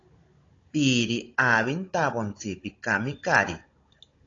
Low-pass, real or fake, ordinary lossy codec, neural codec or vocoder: 7.2 kHz; fake; AAC, 32 kbps; codec, 16 kHz, 16 kbps, FunCodec, trained on Chinese and English, 50 frames a second